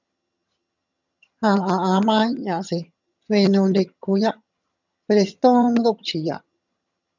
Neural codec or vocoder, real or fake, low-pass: vocoder, 22.05 kHz, 80 mel bands, HiFi-GAN; fake; 7.2 kHz